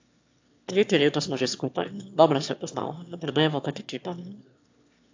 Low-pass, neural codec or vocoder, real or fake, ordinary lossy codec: 7.2 kHz; autoencoder, 22.05 kHz, a latent of 192 numbers a frame, VITS, trained on one speaker; fake; AAC, 48 kbps